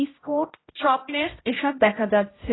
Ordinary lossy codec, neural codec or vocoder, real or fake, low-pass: AAC, 16 kbps; codec, 16 kHz, 0.5 kbps, X-Codec, HuBERT features, trained on general audio; fake; 7.2 kHz